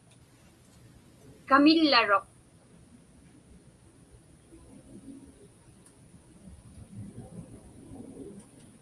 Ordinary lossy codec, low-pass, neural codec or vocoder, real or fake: Opus, 32 kbps; 10.8 kHz; none; real